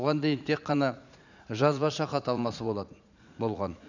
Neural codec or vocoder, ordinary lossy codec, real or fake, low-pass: vocoder, 44.1 kHz, 80 mel bands, Vocos; none; fake; 7.2 kHz